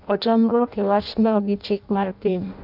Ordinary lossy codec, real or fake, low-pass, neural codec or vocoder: none; fake; 5.4 kHz; codec, 16 kHz in and 24 kHz out, 0.6 kbps, FireRedTTS-2 codec